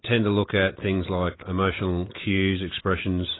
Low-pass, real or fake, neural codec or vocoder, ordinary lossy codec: 7.2 kHz; real; none; AAC, 16 kbps